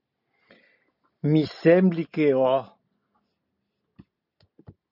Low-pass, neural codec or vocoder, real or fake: 5.4 kHz; none; real